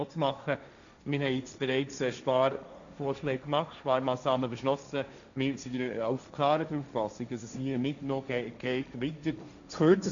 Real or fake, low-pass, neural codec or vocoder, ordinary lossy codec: fake; 7.2 kHz; codec, 16 kHz, 1.1 kbps, Voila-Tokenizer; none